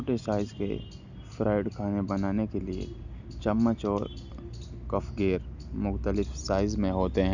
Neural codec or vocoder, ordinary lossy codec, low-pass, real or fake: none; none; 7.2 kHz; real